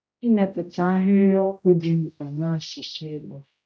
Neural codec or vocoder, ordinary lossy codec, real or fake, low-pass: codec, 16 kHz, 0.5 kbps, X-Codec, HuBERT features, trained on general audio; none; fake; none